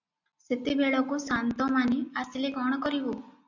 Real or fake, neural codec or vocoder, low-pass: real; none; 7.2 kHz